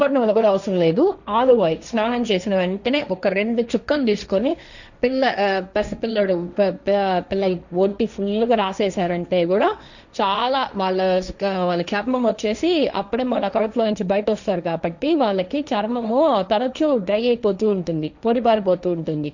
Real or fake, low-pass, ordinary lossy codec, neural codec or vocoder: fake; 7.2 kHz; none; codec, 16 kHz, 1.1 kbps, Voila-Tokenizer